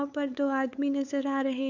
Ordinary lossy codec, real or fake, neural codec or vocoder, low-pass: none; fake; codec, 16 kHz, 8 kbps, FunCodec, trained on LibriTTS, 25 frames a second; 7.2 kHz